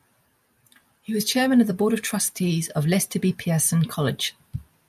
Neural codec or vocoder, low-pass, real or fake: none; 14.4 kHz; real